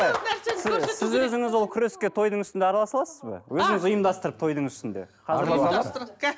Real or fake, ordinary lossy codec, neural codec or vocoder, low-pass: real; none; none; none